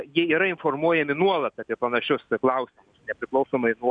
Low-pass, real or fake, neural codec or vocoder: 9.9 kHz; real; none